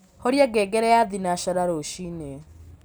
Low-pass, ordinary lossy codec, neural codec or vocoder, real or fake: none; none; none; real